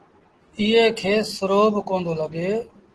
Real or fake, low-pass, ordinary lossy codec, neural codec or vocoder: real; 10.8 kHz; Opus, 16 kbps; none